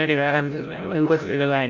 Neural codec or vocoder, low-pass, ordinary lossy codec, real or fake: codec, 16 kHz, 0.5 kbps, FreqCodec, larger model; 7.2 kHz; none; fake